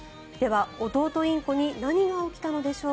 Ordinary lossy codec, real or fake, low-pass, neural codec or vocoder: none; real; none; none